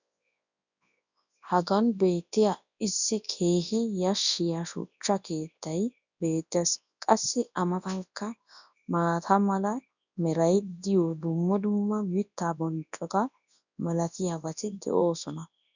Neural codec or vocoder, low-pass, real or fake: codec, 24 kHz, 0.9 kbps, WavTokenizer, large speech release; 7.2 kHz; fake